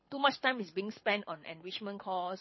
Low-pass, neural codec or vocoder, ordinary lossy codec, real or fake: 7.2 kHz; codec, 24 kHz, 6 kbps, HILCodec; MP3, 24 kbps; fake